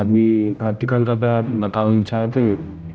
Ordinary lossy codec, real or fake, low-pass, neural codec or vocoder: none; fake; none; codec, 16 kHz, 0.5 kbps, X-Codec, HuBERT features, trained on general audio